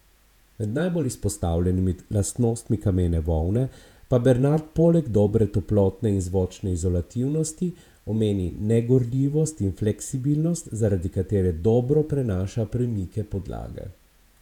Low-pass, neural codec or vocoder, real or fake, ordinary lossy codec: 19.8 kHz; vocoder, 48 kHz, 128 mel bands, Vocos; fake; none